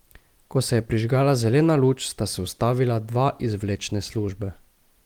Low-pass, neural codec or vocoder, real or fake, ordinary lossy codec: 19.8 kHz; none; real; Opus, 24 kbps